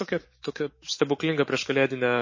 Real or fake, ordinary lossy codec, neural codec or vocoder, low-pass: fake; MP3, 32 kbps; autoencoder, 48 kHz, 128 numbers a frame, DAC-VAE, trained on Japanese speech; 7.2 kHz